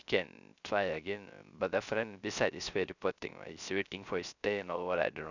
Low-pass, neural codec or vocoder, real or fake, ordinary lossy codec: 7.2 kHz; codec, 16 kHz, 0.3 kbps, FocalCodec; fake; none